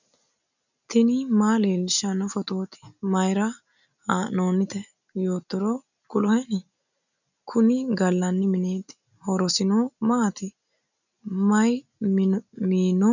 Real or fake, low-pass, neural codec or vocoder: real; 7.2 kHz; none